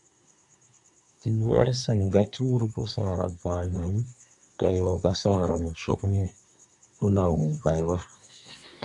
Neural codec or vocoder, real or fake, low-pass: codec, 24 kHz, 1 kbps, SNAC; fake; 10.8 kHz